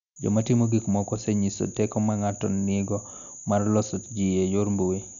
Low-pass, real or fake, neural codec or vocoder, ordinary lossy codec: 7.2 kHz; real; none; none